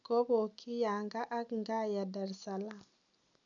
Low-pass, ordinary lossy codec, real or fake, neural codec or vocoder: 7.2 kHz; none; real; none